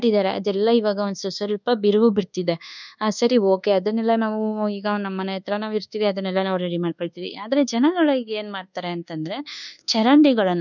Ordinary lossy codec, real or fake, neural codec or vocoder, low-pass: none; fake; codec, 24 kHz, 1.2 kbps, DualCodec; 7.2 kHz